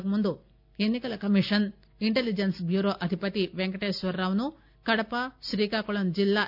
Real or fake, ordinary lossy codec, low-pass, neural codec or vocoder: real; none; 5.4 kHz; none